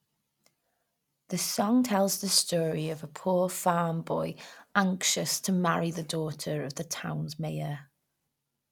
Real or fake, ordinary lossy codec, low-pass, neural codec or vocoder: real; none; 19.8 kHz; none